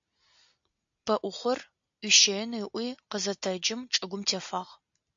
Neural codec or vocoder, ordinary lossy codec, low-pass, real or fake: none; AAC, 64 kbps; 7.2 kHz; real